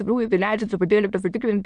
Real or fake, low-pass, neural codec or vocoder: fake; 9.9 kHz; autoencoder, 22.05 kHz, a latent of 192 numbers a frame, VITS, trained on many speakers